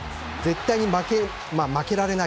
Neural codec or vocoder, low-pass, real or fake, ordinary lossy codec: none; none; real; none